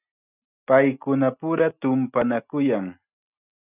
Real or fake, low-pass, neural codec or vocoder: real; 3.6 kHz; none